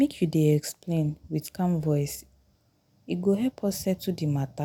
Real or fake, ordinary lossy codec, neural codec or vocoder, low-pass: real; none; none; none